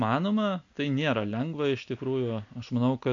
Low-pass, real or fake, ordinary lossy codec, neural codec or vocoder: 7.2 kHz; real; AAC, 64 kbps; none